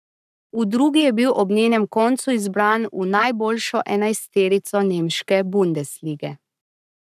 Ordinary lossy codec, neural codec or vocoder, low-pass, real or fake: none; codec, 44.1 kHz, 7.8 kbps, Pupu-Codec; 14.4 kHz; fake